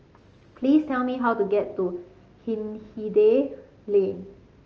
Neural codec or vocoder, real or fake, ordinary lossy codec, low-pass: none; real; Opus, 24 kbps; 7.2 kHz